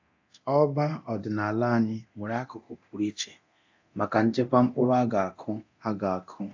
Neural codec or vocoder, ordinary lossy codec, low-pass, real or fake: codec, 24 kHz, 0.9 kbps, DualCodec; AAC, 48 kbps; 7.2 kHz; fake